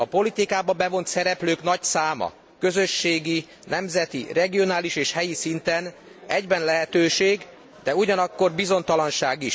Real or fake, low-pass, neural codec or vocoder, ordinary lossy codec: real; none; none; none